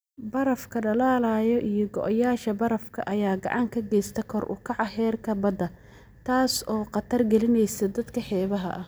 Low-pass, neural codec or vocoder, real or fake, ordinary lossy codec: none; none; real; none